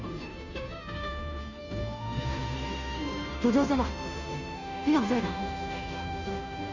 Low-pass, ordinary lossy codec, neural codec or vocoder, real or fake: 7.2 kHz; none; codec, 16 kHz, 0.5 kbps, FunCodec, trained on Chinese and English, 25 frames a second; fake